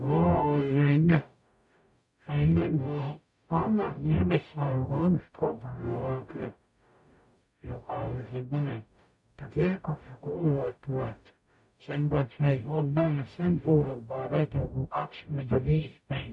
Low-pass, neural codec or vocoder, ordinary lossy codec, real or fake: 10.8 kHz; codec, 44.1 kHz, 0.9 kbps, DAC; none; fake